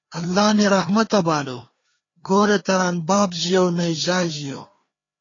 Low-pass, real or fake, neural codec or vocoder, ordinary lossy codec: 7.2 kHz; fake; codec, 16 kHz, 2 kbps, FreqCodec, larger model; AAC, 32 kbps